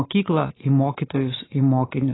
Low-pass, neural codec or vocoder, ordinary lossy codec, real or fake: 7.2 kHz; none; AAC, 16 kbps; real